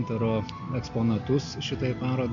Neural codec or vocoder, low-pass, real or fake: none; 7.2 kHz; real